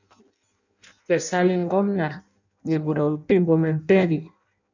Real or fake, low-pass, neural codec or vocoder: fake; 7.2 kHz; codec, 16 kHz in and 24 kHz out, 0.6 kbps, FireRedTTS-2 codec